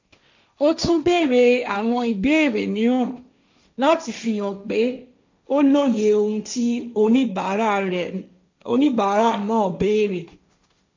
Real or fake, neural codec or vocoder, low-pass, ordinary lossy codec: fake; codec, 16 kHz, 1.1 kbps, Voila-Tokenizer; 7.2 kHz; MP3, 64 kbps